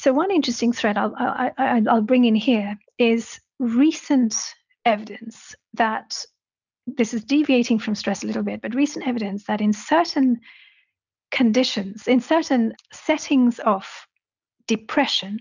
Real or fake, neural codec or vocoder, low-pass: real; none; 7.2 kHz